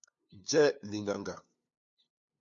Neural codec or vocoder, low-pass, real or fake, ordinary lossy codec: codec, 16 kHz, 2 kbps, FunCodec, trained on LibriTTS, 25 frames a second; 7.2 kHz; fake; MP3, 48 kbps